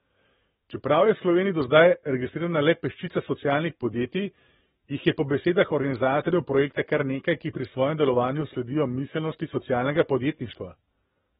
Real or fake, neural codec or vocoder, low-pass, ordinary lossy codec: fake; codec, 44.1 kHz, 7.8 kbps, DAC; 19.8 kHz; AAC, 16 kbps